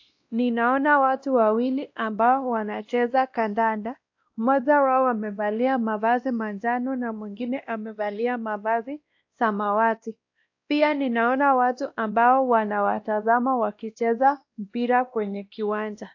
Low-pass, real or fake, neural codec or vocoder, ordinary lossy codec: 7.2 kHz; fake; codec, 16 kHz, 1 kbps, X-Codec, WavLM features, trained on Multilingual LibriSpeech; AAC, 48 kbps